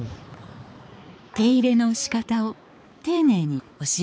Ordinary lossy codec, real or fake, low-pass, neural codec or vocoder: none; fake; none; codec, 16 kHz, 4 kbps, X-Codec, HuBERT features, trained on balanced general audio